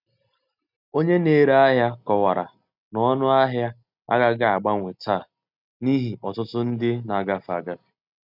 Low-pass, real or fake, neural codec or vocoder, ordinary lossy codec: 5.4 kHz; real; none; none